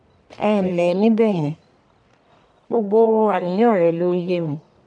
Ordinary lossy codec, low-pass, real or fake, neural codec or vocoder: none; 9.9 kHz; fake; codec, 44.1 kHz, 1.7 kbps, Pupu-Codec